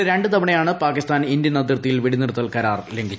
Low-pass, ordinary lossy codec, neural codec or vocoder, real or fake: none; none; none; real